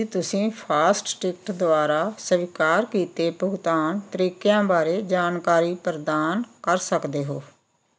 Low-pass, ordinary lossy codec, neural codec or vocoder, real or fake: none; none; none; real